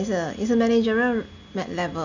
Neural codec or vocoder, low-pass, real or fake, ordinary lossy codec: none; 7.2 kHz; real; none